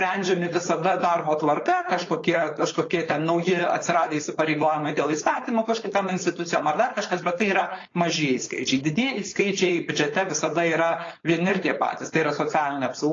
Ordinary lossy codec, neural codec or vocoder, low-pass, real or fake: AAC, 32 kbps; codec, 16 kHz, 4.8 kbps, FACodec; 7.2 kHz; fake